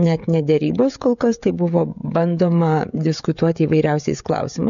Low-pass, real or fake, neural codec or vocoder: 7.2 kHz; fake; codec, 16 kHz, 16 kbps, FreqCodec, smaller model